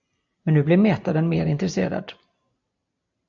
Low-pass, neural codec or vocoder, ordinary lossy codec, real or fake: 7.2 kHz; none; AAC, 64 kbps; real